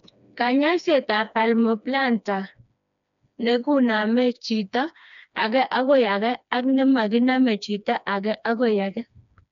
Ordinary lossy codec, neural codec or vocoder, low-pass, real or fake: none; codec, 16 kHz, 2 kbps, FreqCodec, smaller model; 7.2 kHz; fake